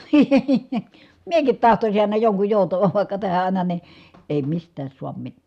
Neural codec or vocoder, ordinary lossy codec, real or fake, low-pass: none; none; real; 14.4 kHz